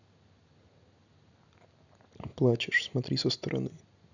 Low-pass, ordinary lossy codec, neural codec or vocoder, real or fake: 7.2 kHz; none; none; real